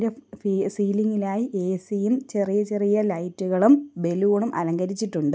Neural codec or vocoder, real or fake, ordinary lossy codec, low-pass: none; real; none; none